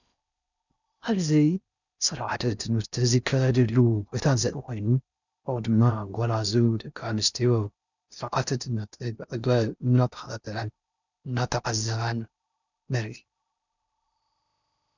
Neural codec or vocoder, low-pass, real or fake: codec, 16 kHz in and 24 kHz out, 0.6 kbps, FocalCodec, streaming, 4096 codes; 7.2 kHz; fake